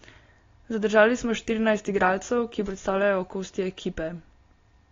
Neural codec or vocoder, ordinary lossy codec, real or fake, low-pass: none; AAC, 32 kbps; real; 7.2 kHz